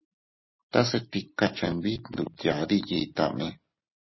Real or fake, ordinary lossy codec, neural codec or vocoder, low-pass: real; MP3, 24 kbps; none; 7.2 kHz